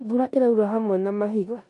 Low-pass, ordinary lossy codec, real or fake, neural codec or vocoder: 10.8 kHz; MP3, 64 kbps; fake; codec, 16 kHz in and 24 kHz out, 0.9 kbps, LongCat-Audio-Codec, four codebook decoder